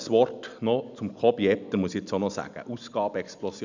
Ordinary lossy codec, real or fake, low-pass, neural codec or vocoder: none; real; 7.2 kHz; none